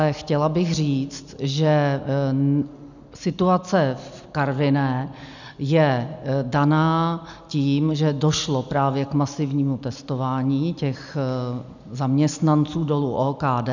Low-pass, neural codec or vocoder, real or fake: 7.2 kHz; none; real